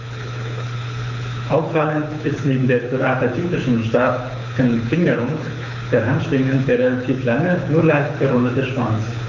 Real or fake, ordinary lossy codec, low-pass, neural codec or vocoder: fake; none; 7.2 kHz; codec, 24 kHz, 6 kbps, HILCodec